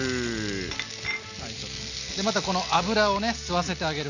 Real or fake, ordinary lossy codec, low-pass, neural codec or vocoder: real; none; 7.2 kHz; none